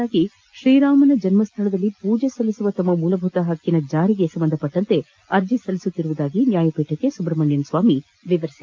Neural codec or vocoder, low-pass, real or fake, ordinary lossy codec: none; 7.2 kHz; real; Opus, 32 kbps